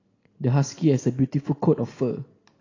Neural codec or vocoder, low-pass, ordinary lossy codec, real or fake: none; 7.2 kHz; AAC, 32 kbps; real